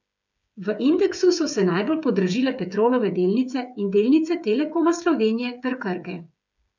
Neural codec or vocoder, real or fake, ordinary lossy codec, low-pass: codec, 16 kHz, 8 kbps, FreqCodec, smaller model; fake; none; 7.2 kHz